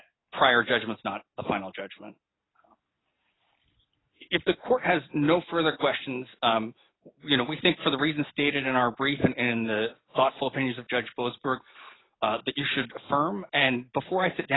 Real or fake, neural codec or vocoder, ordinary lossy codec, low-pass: real; none; AAC, 16 kbps; 7.2 kHz